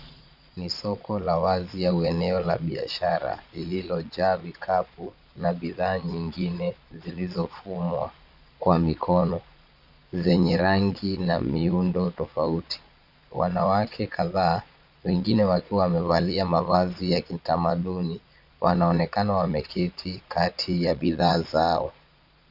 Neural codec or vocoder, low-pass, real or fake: vocoder, 22.05 kHz, 80 mel bands, Vocos; 5.4 kHz; fake